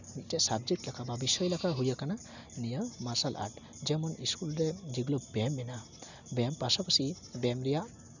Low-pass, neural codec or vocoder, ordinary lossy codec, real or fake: 7.2 kHz; none; none; real